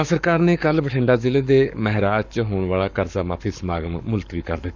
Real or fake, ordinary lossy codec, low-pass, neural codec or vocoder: fake; none; 7.2 kHz; codec, 44.1 kHz, 7.8 kbps, Pupu-Codec